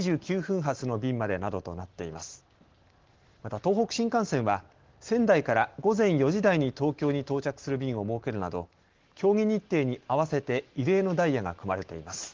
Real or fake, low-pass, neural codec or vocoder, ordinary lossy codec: real; 7.2 kHz; none; Opus, 16 kbps